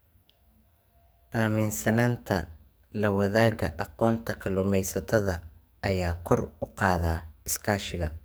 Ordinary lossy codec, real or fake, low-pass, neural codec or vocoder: none; fake; none; codec, 44.1 kHz, 2.6 kbps, SNAC